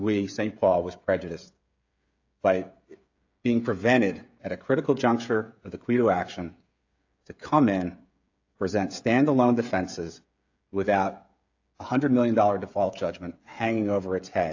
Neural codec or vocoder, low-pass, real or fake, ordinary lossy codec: codec, 16 kHz, 16 kbps, FreqCodec, smaller model; 7.2 kHz; fake; AAC, 48 kbps